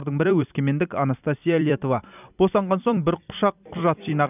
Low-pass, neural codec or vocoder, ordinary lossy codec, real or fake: 3.6 kHz; vocoder, 44.1 kHz, 128 mel bands every 256 samples, BigVGAN v2; none; fake